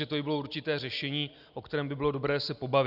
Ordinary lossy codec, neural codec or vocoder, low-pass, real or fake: Opus, 64 kbps; none; 5.4 kHz; real